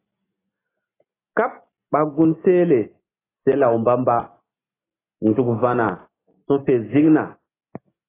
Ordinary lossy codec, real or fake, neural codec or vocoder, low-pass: AAC, 16 kbps; real; none; 3.6 kHz